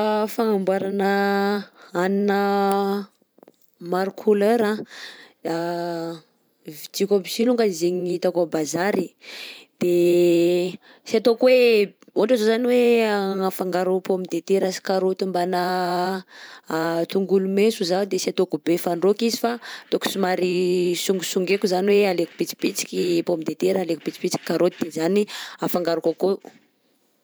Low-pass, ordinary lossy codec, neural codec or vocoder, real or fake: none; none; vocoder, 44.1 kHz, 128 mel bands every 512 samples, BigVGAN v2; fake